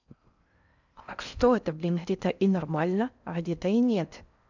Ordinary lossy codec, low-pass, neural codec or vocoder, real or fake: none; 7.2 kHz; codec, 16 kHz in and 24 kHz out, 0.6 kbps, FocalCodec, streaming, 4096 codes; fake